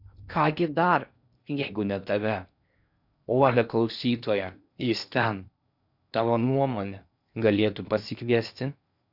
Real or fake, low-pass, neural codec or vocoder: fake; 5.4 kHz; codec, 16 kHz in and 24 kHz out, 0.8 kbps, FocalCodec, streaming, 65536 codes